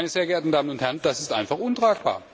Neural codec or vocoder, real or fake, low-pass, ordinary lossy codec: none; real; none; none